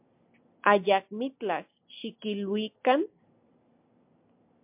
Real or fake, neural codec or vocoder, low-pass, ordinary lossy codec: real; none; 3.6 kHz; MP3, 32 kbps